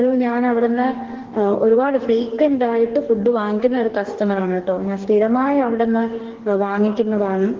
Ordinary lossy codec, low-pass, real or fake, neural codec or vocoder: Opus, 16 kbps; 7.2 kHz; fake; codec, 44.1 kHz, 2.6 kbps, DAC